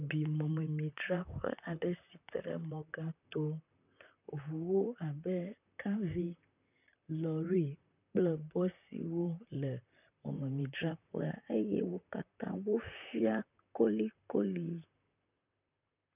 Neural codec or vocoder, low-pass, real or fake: vocoder, 44.1 kHz, 128 mel bands, Pupu-Vocoder; 3.6 kHz; fake